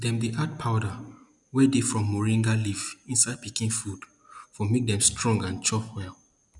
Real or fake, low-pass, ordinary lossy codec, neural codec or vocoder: real; 10.8 kHz; none; none